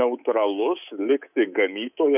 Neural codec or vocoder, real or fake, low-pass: codec, 24 kHz, 3.1 kbps, DualCodec; fake; 3.6 kHz